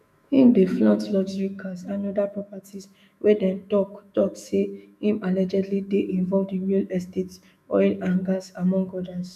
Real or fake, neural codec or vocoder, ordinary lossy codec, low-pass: fake; autoencoder, 48 kHz, 128 numbers a frame, DAC-VAE, trained on Japanese speech; none; 14.4 kHz